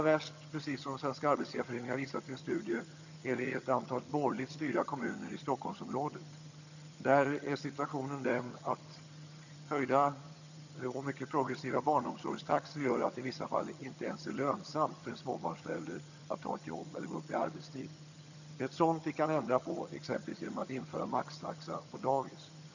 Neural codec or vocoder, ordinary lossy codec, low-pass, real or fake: vocoder, 22.05 kHz, 80 mel bands, HiFi-GAN; none; 7.2 kHz; fake